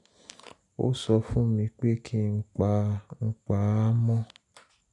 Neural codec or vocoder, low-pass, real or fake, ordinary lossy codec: none; 10.8 kHz; real; none